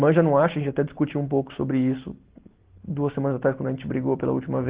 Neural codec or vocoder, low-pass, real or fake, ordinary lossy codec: none; 3.6 kHz; real; Opus, 16 kbps